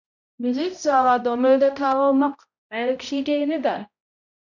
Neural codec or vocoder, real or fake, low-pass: codec, 16 kHz, 0.5 kbps, X-Codec, HuBERT features, trained on balanced general audio; fake; 7.2 kHz